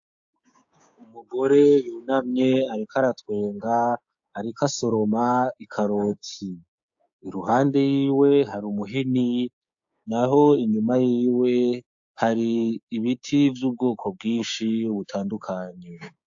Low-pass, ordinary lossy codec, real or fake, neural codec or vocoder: 7.2 kHz; AAC, 64 kbps; fake; codec, 16 kHz, 6 kbps, DAC